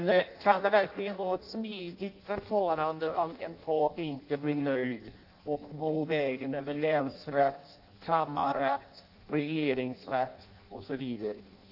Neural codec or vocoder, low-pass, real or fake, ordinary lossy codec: codec, 16 kHz in and 24 kHz out, 0.6 kbps, FireRedTTS-2 codec; 5.4 kHz; fake; AAC, 48 kbps